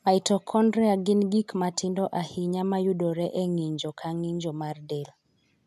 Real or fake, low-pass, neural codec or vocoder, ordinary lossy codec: real; 14.4 kHz; none; none